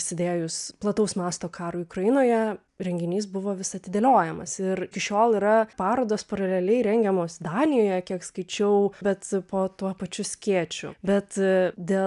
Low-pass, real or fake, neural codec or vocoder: 10.8 kHz; real; none